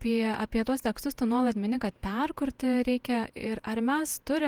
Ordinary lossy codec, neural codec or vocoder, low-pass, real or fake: Opus, 24 kbps; vocoder, 48 kHz, 128 mel bands, Vocos; 19.8 kHz; fake